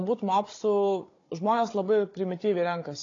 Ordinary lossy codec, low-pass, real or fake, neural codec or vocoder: AAC, 32 kbps; 7.2 kHz; fake; codec, 16 kHz, 16 kbps, FunCodec, trained on Chinese and English, 50 frames a second